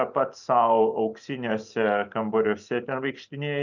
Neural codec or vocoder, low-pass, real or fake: none; 7.2 kHz; real